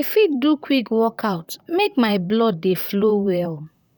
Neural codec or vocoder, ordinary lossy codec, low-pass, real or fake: vocoder, 44.1 kHz, 128 mel bands, Pupu-Vocoder; none; 19.8 kHz; fake